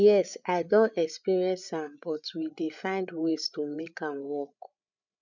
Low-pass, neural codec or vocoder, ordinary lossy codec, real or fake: 7.2 kHz; codec, 16 kHz, 8 kbps, FreqCodec, larger model; none; fake